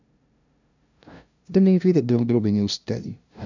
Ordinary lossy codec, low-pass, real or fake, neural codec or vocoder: none; 7.2 kHz; fake; codec, 16 kHz, 0.5 kbps, FunCodec, trained on LibriTTS, 25 frames a second